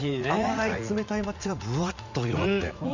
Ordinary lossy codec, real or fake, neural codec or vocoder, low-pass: none; fake; vocoder, 44.1 kHz, 80 mel bands, Vocos; 7.2 kHz